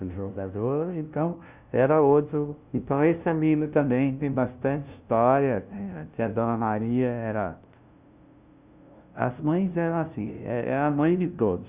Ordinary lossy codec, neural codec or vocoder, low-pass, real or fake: Opus, 64 kbps; codec, 16 kHz, 0.5 kbps, FunCodec, trained on LibriTTS, 25 frames a second; 3.6 kHz; fake